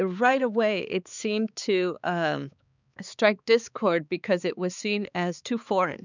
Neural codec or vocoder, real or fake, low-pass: codec, 16 kHz, 4 kbps, X-Codec, HuBERT features, trained on balanced general audio; fake; 7.2 kHz